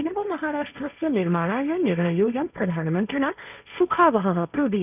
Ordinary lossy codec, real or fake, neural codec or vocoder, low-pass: none; fake; codec, 16 kHz, 1.1 kbps, Voila-Tokenizer; 3.6 kHz